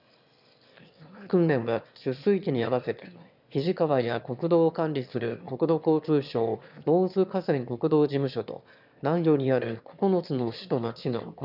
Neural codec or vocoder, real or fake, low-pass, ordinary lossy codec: autoencoder, 22.05 kHz, a latent of 192 numbers a frame, VITS, trained on one speaker; fake; 5.4 kHz; none